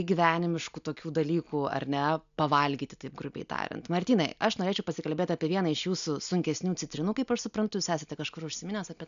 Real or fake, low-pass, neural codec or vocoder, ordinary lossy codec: real; 7.2 kHz; none; MP3, 96 kbps